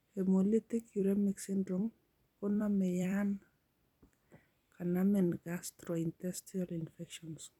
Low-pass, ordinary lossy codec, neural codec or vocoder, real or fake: 19.8 kHz; none; vocoder, 44.1 kHz, 128 mel bands every 256 samples, BigVGAN v2; fake